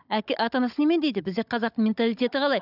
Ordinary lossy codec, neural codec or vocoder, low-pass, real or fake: none; codec, 16 kHz, 16 kbps, FunCodec, trained on Chinese and English, 50 frames a second; 5.4 kHz; fake